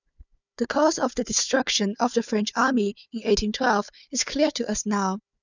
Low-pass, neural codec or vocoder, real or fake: 7.2 kHz; codec, 16 kHz, 4 kbps, FunCodec, trained on Chinese and English, 50 frames a second; fake